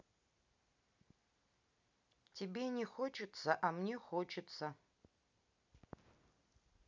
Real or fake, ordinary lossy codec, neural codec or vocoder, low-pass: real; none; none; 7.2 kHz